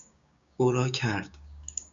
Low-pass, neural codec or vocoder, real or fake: 7.2 kHz; codec, 16 kHz, 6 kbps, DAC; fake